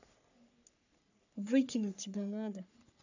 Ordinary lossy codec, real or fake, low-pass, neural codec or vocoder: none; fake; 7.2 kHz; codec, 44.1 kHz, 3.4 kbps, Pupu-Codec